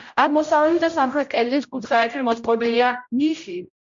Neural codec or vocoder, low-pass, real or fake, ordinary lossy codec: codec, 16 kHz, 0.5 kbps, X-Codec, HuBERT features, trained on general audio; 7.2 kHz; fake; MP3, 48 kbps